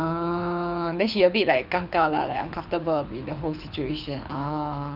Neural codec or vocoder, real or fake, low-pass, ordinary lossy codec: codec, 24 kHz, 6 kbps, HILCodec; fake; 5.4 kHz; none